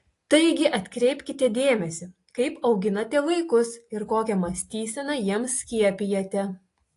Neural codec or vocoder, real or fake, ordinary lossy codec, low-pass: none; real; AAC, 48 kbps; 10.8 kHz